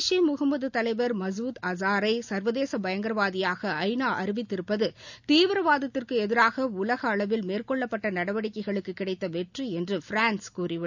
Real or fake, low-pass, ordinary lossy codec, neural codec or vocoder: real; 7.2 kHz; none; none